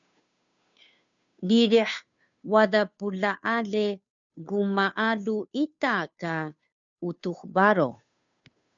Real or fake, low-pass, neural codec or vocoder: fake; 7.2 kHz; codec, 16 kHz, 2 kbps, FunCodec, trained on Chinese and English, 25 frames a second